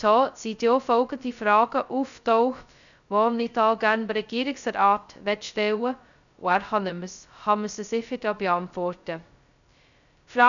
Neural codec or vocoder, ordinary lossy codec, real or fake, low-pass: codec, 16 kHz, 0.2 kbps, FocalCodec; none; fake; 7.2 kHz